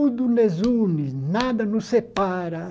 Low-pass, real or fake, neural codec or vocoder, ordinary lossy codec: none; real; none; none